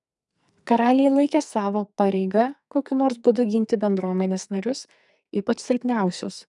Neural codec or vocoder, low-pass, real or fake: codec, 44.1 kHz, 2.6 kbps, SNAC; 10.8 kHz; fake